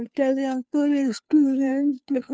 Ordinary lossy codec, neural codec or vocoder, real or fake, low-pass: none; codec, 16 kHz, 2 kbps, FunCodec, trained on Chinese and English, 25 frames a second; fake; none